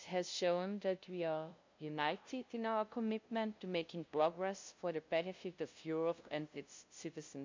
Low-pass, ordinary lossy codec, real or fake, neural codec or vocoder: 7.2 kHz; MP3, 48 kbps; fake; codec, 16 kHz, 0.5 kbps, FunCodec, trained on LibriTTS, 25 frames a second